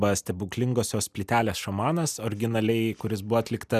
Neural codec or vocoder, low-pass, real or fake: none; 14.4 kHz; real